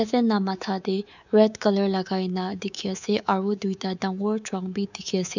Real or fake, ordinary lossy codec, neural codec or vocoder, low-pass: fake; none; codec, 24 kHz, 3.1 kbps, DualCodec; 7.2 kHz